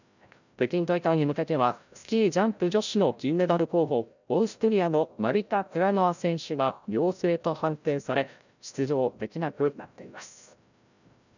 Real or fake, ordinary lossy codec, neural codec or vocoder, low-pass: fake; none; codec, 16 kHz, 0.5 kbps, FreqCodec, larger model; 7.2 kHz